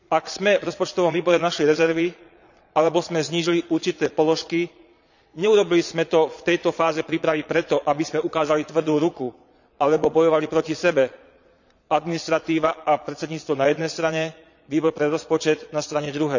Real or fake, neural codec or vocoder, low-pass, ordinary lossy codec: fake; vocoder, 22.05 kHz, 80 mel bands, Vocos; 7.2 kHz; none